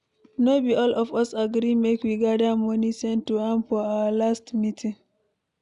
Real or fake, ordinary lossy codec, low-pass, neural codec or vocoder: real; none; 10.8 kHz; none